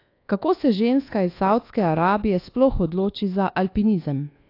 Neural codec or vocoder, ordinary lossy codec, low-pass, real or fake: codec, 24 kHz, 1.2 kbps, DualCodec; AAC, 32 kbps; 5.4 kHz; fake